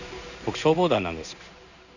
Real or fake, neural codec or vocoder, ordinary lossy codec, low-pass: fake; codec, 16 kHz in and 24 kHz out, 1 kbps, XY-Tokenizer; none; 7.2 kHz